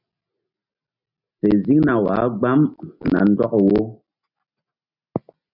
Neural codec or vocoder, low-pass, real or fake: none; 5.4 kHz; real